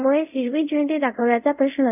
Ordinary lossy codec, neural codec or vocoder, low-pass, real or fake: none; codec, 24 kHz, 0.5 kbps, DualCodec; 3.6 kHz; fake